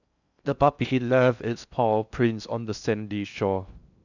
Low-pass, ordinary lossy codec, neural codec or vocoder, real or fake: 7.2 kHz; none; codec, 16 kHz in and 24 kHz out, 0.6 kbps, FocalCodec, streaming, 4096 codes; fake